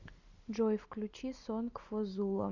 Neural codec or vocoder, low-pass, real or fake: none; 7.2 kHz; real